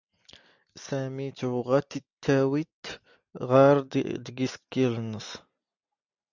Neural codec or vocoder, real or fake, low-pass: none; real; 7.2 kHz